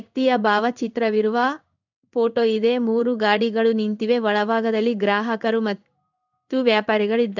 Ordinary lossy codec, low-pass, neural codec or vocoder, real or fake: none; 7.2 kHz; codec, 16 kHz in and 24 kHz out, 1 kbps, XY-Tokenizer; fake